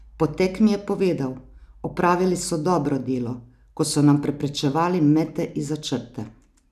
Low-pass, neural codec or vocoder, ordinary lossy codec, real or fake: 14.4 kHz; none; none; real